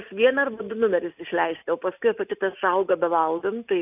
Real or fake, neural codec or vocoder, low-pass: real; none; 3.6 kHz